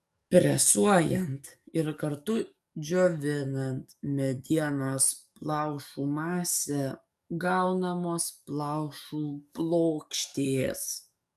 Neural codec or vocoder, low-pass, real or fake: codec, 44.1 kHz, 7.8 kbps, DAC; 14.4 kHz; fake